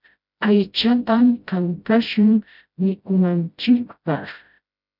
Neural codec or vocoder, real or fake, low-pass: codec, 16 kHz, 0.5 kbps, FreqCodec, smaller model; fake; 5.4 kHz